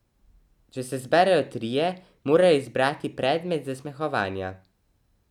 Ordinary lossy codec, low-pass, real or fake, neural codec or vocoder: none; 19.8 kHz; real; none